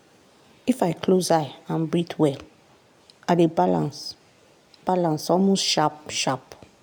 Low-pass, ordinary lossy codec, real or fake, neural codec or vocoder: none; none; real; none